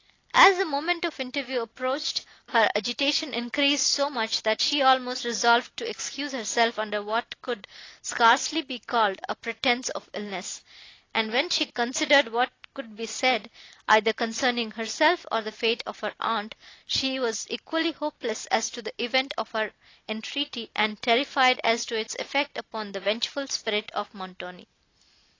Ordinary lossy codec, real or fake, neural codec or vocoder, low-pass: AAC, 32 kbps; real; none; 7.2 kHz